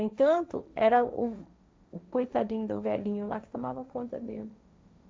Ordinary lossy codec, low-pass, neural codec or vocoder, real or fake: none; none; codec, 16 kHz, 1.1 kbps, Voila-Tokenizer; fake